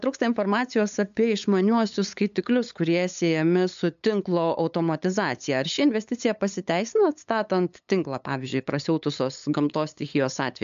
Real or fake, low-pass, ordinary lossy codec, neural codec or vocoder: fake; 7.2 kHz; MP3, 64 kbps; codec, 16 kHz, 8 kbps, FunCodec, trained on LibriTTS, 25 frames a second